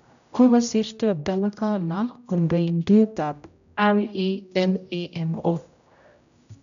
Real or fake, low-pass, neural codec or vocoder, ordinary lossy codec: fake; 7.2 kHz; codec, 16 kHz, 0.5 kbps, X-Codec, HuBERT features, trained on general audio; none